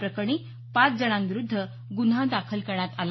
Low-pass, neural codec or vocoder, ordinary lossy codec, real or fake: 7.2 kHz; none; MP3, 24 kbps; real